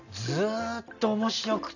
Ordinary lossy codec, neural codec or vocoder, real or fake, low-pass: none; none; real; 7.2 kHz